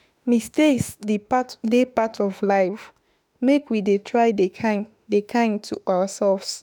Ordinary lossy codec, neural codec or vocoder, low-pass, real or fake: none; autoencoder, 48 kHz, 32 numbers a frame, DAC-VAE, trained on Japanese speech; none; fake